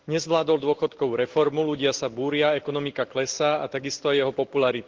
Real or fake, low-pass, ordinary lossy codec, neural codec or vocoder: real; 7.2 kHz; Opus, 16 kbps; none